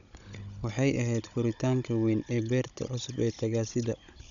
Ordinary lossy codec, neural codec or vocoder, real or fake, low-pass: none; codec, 16 kHz, 16 kbps, FunCodec, trained on Chinese and English, 50 frames a second; fake; 7.2 kHz